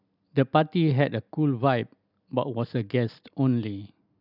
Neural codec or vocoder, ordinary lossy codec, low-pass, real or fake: none; none; 5.4 kHz; real